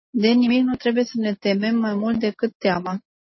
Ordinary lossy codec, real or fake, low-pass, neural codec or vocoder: MP3, 24 kbps; real; 7.2 kHz; none